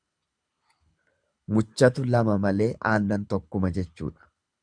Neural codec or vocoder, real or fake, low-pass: codec, 24 kHz, 6 kbps, HILCodec; fake; 9.9 kHz